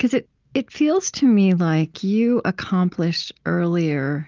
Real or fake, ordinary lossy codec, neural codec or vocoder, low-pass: real; Opus, 32 kbps; none; 7.2 kHz